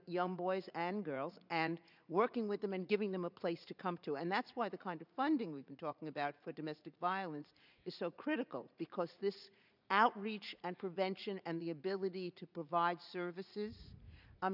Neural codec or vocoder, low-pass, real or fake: vocoder, 44.1 kHz, 80 mel bands, Vocos; 5.4 kHz; fake